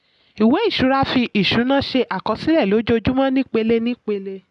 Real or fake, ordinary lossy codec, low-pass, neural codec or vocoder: real; none; 10.8 kHz; none